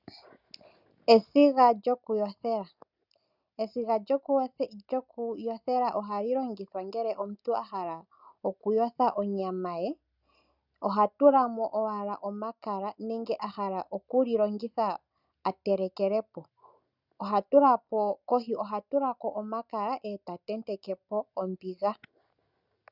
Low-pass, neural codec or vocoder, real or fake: 5.4 kHz; none; real